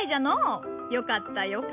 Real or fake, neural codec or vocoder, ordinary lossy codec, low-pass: real; none; none; 3.6 kHz